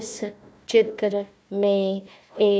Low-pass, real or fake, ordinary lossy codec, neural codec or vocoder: none; fake; none; codec, 16 kHz, 1 kbps, FunCodec, trained on Chinese and English, 50 frames a second